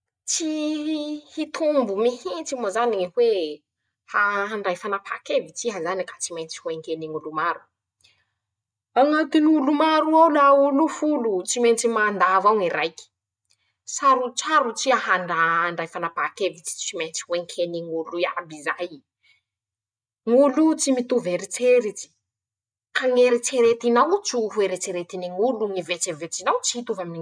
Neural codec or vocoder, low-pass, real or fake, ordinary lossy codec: none; none; real; none